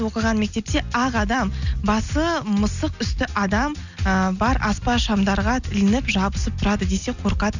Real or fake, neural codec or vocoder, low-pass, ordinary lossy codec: real; none; 7.2 kHz; none